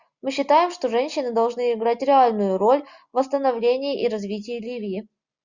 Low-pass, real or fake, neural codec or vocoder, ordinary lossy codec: 7.2 kHz; real; none; Opus, 64 kbps